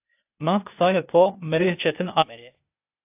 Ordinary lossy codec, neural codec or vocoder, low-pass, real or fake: AAC, 32 kbps; codec, 16 kHz, 0.8 kbps, ZipCodec; 3.6 kHz; fake